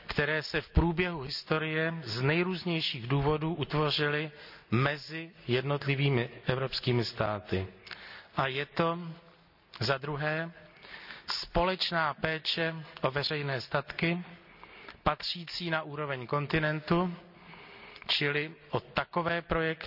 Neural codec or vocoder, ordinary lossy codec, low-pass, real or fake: none; none; 5.4 kHz; real